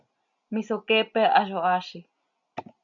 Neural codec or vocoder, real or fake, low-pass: none; real; 7.2 kHz